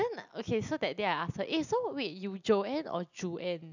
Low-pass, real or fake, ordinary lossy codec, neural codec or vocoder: 7.2 kHz; real; none; none